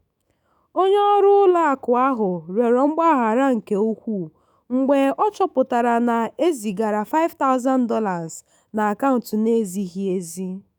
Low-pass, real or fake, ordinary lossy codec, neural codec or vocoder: none; fake; none; autoencoder, 48 kHz, 128 numbers a frame, DAC-VAE, trained on Japanese speech